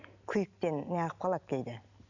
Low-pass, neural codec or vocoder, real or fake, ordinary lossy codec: 7.2 kHz; none; real; none